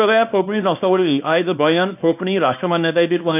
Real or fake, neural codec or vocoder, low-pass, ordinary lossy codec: fake; codec, 24 kHz, 0.9 kbps, WavTokenizer, small release; 3.6 kHz; none